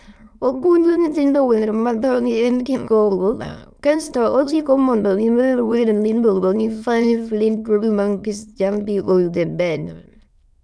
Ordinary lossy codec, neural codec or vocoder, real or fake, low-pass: none; autoencoder, 22.05 kHz, a latent of 192 numbers a frame, VITS, trained on many speakers; fake; none